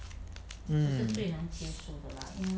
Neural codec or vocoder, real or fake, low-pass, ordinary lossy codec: none; real; none; none